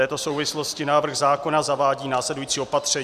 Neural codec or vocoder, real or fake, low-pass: none; real; 14.4 kHz